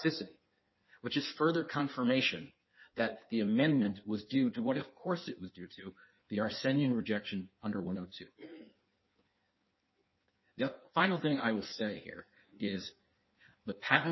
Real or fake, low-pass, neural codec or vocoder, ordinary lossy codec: fake; 7.2 kHz; codec, 16 kHz in and 24 kHz out, 1.1 kbps, FireRedTTS-2 codec; MP3, 24 kbps